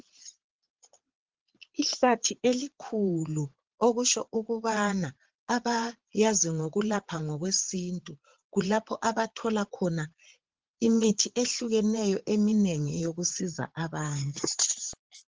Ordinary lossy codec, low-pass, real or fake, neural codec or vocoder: Opus, 16 kbps; 7.2 kHz; fake; vocoder, 22.05 kHz, 80 mel bands, Vocos